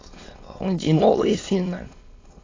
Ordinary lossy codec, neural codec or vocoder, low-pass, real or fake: AAC, 32 kbps; autoencoder, 22.05 kHz, a latent of 192 numbers a frame, VITS, trained on many speakers; 7.2 kHz; fake